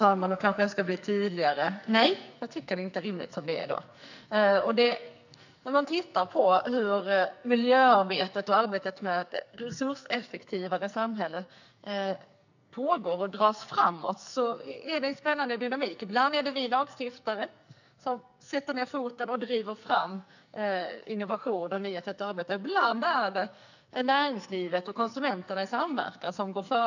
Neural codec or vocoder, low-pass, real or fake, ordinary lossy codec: codec, 44.1 kHz, 2.6 kbps, SNAC; 7.2 kHz; fake; none